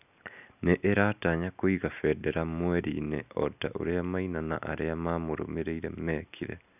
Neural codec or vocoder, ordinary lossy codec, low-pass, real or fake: none; none; 3.6 kHz; real